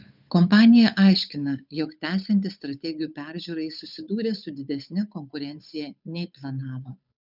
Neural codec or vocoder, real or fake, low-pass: codec, 16 kHz, 8 kbps, FunCodec, trained on Chinese and English, 25 frames a second; fake; 5.4 kHz